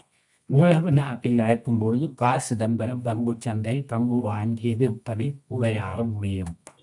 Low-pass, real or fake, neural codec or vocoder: 10.8 kHz; fake; codec, 24 kHz, 0.9 kbps, WavTokenizer, medium music audio release